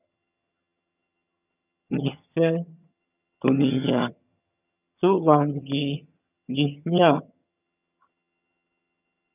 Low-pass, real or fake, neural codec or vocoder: 3.6 kHz; fake; vocoder, 22.05 kHz, 80 mel bands, HiFi-GAN